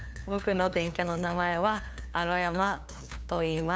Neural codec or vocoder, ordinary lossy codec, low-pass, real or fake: codec, 16 kHz, 2 kbps, FunCodec, trained on LibriTTS, 25 frames a second; none; none; fake